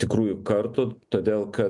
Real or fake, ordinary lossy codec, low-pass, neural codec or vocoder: real; MP3, 64 kbps; 10.8 kHz; none